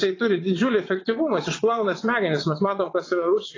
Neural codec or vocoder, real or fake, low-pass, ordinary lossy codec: vocoder, 22.05 kHz, 80 mel bands, WaveNeXt; fake; 7.2 kHz; AAC, 32 kbps